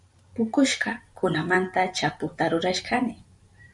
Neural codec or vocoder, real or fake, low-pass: vocoder, 44.1 kHz, 128 mel bands every 512 samples, BigVGAN v2; fake; 10.8 kHz